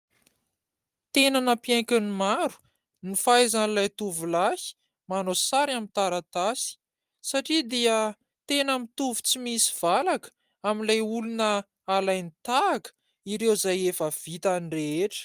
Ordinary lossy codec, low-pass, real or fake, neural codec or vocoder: Opus, 32 kbps; 14.4 kHz; real; none